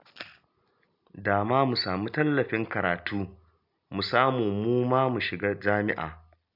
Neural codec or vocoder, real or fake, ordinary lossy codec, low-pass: none; real; AAC, 48 kbps; 5.4 kHz